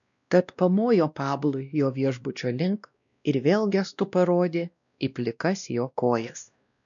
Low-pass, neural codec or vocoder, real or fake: 7.2 kHz; codec, 16 kHz, 1 kbps, X-Codec, WavLM features, trained on Multilingual LibriSpeech; fake